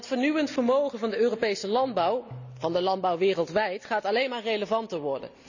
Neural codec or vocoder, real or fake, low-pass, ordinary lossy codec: none; real; 7.2 kHz; none